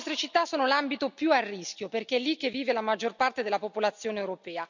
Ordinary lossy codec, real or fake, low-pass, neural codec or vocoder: none; real; 7.2 kHz; none